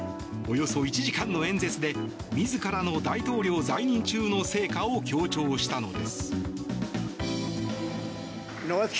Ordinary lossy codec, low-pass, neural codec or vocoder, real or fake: none; none; none; real